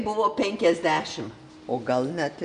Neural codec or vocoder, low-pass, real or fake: none; 9.9 kHz; real